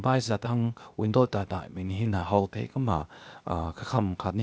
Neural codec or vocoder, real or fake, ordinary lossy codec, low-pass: codec, 16 kHz, 0.8 kbps, ZipCodec; fake; none; none